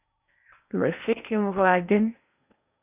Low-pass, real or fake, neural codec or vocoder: 3.6 kHz; fake; codec, 16 kHz in and 24 kHz out, 0.8 kbps, FocalCodec, streaming, 65536 codes